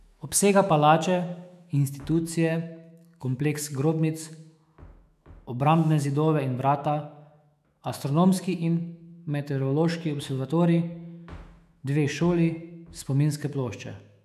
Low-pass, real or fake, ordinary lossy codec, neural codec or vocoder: 14.4 kHz; fake; none; autoencoder, 48 kHz, 128 numbers a frame, DAC-VAE, trained on Japanese speech